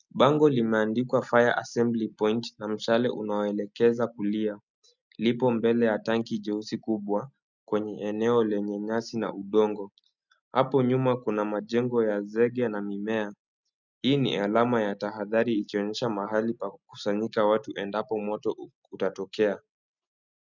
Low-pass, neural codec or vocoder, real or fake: 7.2 kHz; none; real